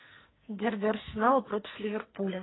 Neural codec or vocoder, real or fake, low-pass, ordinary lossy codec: codec, 44.1 kHz, 2.6 kbps, SNAC; fake; 7.2 kHz; AAC, 16 kbps